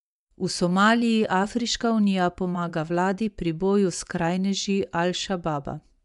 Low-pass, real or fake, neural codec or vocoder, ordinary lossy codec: 10.8 kHz; fake; vocoder, 24 kHz, 100 mel bands, Vocos; none